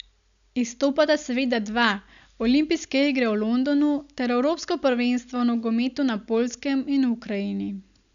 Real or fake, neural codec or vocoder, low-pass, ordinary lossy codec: real; none; 7.2 kHz; none